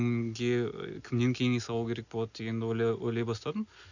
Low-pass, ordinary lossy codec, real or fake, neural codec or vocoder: 7.2 kHz; none; real; none